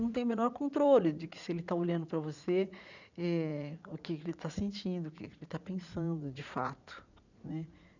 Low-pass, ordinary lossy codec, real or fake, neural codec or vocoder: 7.2 kHz; none; fake; vocoder, 44.1 kHz, 80 mel bands, Vocos